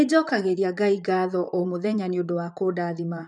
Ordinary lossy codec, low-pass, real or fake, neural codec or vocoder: none; 10.8 kHz; fake; vocoder, 44.1 kHz, 128 mel bands, Pupu-Vocoder